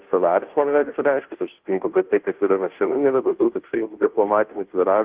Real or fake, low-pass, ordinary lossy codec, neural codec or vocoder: fake; 3.6 kHz; Opus, 16 kbps; codec, 16 kHz, 0.5 kbps, FunCodec, trained on Chinese and English, 25 frames a second